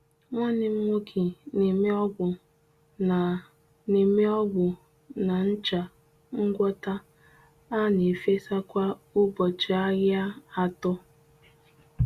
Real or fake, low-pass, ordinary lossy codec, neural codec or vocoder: real; 14.4 kHz; Opus, 64 kbps; none